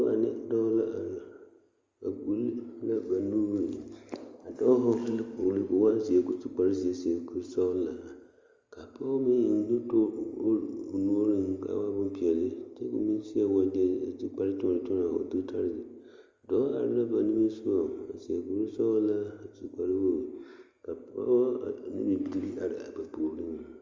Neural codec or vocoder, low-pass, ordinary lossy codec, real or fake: none; 7.2 kHz; Opus, 32 kbps; real